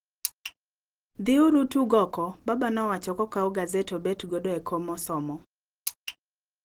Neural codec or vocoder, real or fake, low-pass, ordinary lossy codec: none; real; 19.8 kHz; Opus, 16 kbps